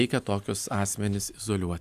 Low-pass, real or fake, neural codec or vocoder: 14.4 kHz; real; none